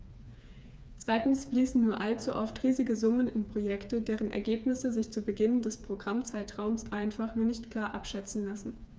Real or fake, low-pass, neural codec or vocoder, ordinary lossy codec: fake; none; codec, 16 kHz, 4 kbps, FreqCodec, smaller model; none